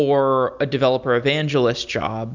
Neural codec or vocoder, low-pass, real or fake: none; 7.2 kHz; real